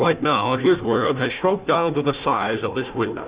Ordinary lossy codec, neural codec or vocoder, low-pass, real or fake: Opus, 64 kbps; codec, 16 kHz, 1 kbps, FunCodec, trained on Chinese and English, 50 frames a second; 3.6 kHz; fake